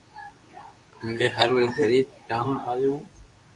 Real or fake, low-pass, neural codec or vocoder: fake; 10.8 kHz; codec, 24 kHz, 0.9 kbps, WavTokenizer, medium speech release version 2